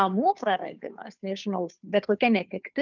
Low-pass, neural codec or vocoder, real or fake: 7.2 kHz; codec, 16 kHz, 2 kbps, FunCodec, trained on Chinese and English, 25 frames a second; fake